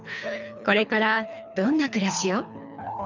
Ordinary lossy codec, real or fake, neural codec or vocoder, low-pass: none; fake; codec, 24 kHz, 3 kbps, HILCodec; 7.2 kHz